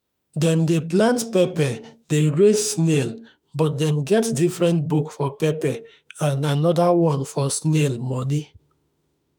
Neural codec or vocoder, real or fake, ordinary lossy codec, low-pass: autoencoder, 48 kHz, 32 numbers a frame, DAC-VAE, trained on Japanese speech; fake; none; none